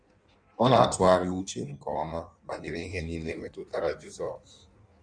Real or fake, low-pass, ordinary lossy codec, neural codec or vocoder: fake; 9.9 kHz; none; codec, 16 kHz in and 24 kHz out, 1.1 kbps, FireRedTTS-2 codec